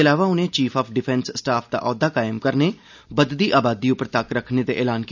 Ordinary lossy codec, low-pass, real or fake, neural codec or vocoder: none; 7.2 kHz; real; none